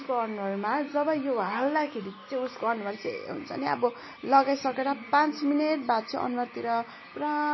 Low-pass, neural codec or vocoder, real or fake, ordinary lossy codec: 7.2 kHz; none; real; MP3, 24 kbps